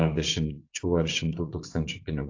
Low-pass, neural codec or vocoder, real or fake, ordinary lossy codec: 7.2 kHz; vocoder, 24 kHz, 100 mel bands, Vocos; fake; AAC, 48 kbps